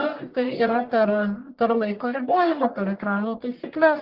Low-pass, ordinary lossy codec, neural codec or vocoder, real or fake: 5.4 kHz; Opus, 16 kbps; codec, 44.1 kHz, 1.7 kbps, Pupu-Codec; fake